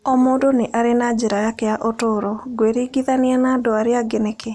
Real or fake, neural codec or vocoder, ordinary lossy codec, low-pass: real; none; none; none